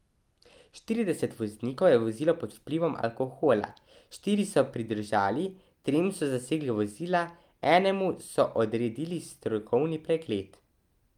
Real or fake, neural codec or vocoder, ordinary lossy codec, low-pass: real; none; Opus, 32 kbps; 19.8 kHz